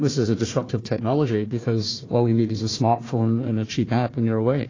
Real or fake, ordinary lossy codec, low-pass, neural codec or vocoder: fake; AAC, 32 kbps; 7.2 kHz; codec, 16 kHz, 1 kbps, FunCodec, trained on Chinese and English, 50 frames a second